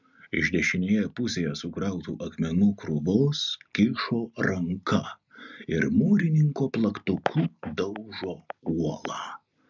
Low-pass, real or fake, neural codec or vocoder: 7.2 kHz; real; none